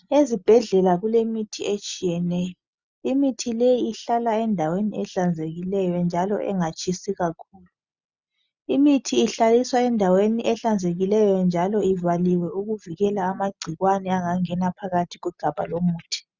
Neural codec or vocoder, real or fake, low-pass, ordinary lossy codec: none; real; 7.2 kHz; Opus, 64 kbps